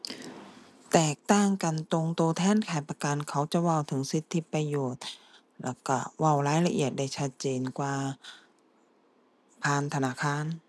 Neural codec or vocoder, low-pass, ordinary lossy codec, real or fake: none; none; none; real